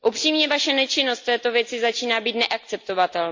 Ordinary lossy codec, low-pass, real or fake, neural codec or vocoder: MP3, 48 kbps; 7.2 kHz; real; none